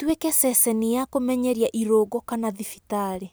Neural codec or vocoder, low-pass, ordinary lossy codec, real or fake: none; none; none; real